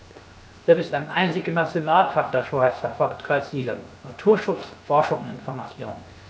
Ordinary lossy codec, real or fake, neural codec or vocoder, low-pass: none; fake; codec, 16 kHz, 0.7 kbps, FocalCodec; none